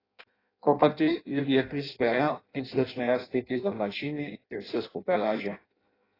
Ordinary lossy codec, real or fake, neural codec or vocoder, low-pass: AAC, 24 kbps; fake; codec, 16 kHz in and 24 kHz out, 0.6 kbps, FireRedTTS-2 codec; 5.4 kHz